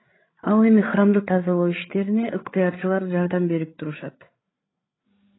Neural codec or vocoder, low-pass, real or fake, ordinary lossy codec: codec, 16 kHz, 8 kbps, FreqCodec, larger model; 7.2 kHz; fake; AAC, 16 kbps